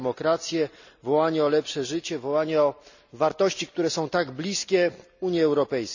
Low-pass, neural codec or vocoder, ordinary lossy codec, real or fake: 7.2 kHz; none; none; real